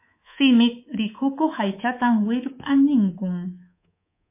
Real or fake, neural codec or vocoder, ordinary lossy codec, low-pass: fake; codec, 24 kHz, 3.1 kbps, DualCodec; MP3, 24 kbps; 3.6 kHz